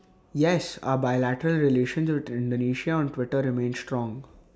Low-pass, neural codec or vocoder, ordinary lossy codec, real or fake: none; none; none; real